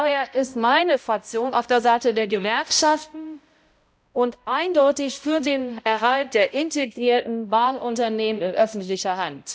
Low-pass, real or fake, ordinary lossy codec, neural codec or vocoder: none; fake; none; codec, 16 kHz, 0.5 kbps, X-Codec, HuBERT features, trained on balanced general audio